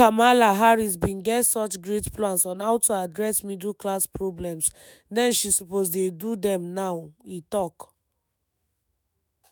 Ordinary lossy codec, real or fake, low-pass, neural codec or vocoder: none; fake; none; autoencoder, 48 kHz, 128 numbers a frame, DAC-VAE, trained on Japanese speech